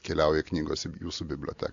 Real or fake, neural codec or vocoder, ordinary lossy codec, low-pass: real; none; AAC, 64 kbps; 7.2 kHz